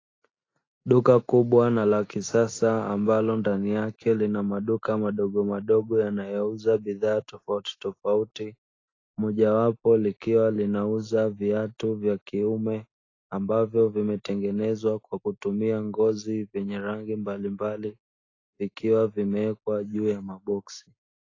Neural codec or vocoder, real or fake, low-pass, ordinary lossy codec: none; real; 7.2 kHz; AAC, 32 kbps